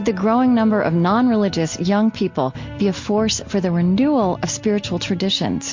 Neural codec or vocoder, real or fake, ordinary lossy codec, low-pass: none; real; MP3, 48 kbps; 7.2 kHz